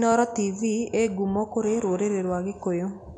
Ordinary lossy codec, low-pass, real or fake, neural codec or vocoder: MP3, 64 kbps; 9.9 kHz; real; none